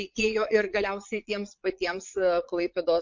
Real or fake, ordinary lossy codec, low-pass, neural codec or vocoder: fake; MP3, 48 kbps; 7.2 kHz; vocoder, 22.05 kHz, 80 mel bands, Vocos